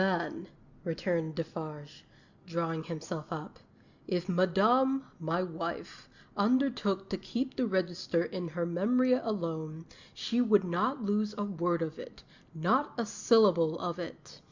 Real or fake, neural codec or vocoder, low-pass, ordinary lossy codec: real; none; 7.2 kHz; Opus, 64 kbps